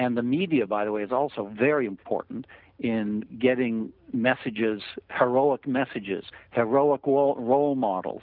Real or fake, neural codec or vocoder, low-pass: real; none; 5.4 kHz